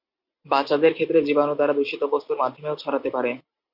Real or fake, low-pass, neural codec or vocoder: real; 5.4 kHz; none